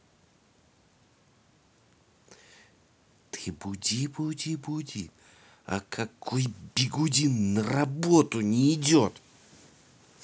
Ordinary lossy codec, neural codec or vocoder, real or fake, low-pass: none; none; real; none